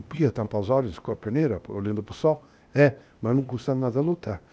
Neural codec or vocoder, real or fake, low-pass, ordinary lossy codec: codec, 16 kHz, 0.8 kbps, ZipCodec; fake; none; none